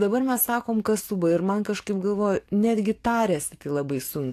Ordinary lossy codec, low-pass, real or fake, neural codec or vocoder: AAC, 64 kbps; 14.4 kHz; fake; codec, 44.1 kHz, 7.8 kbps, DAC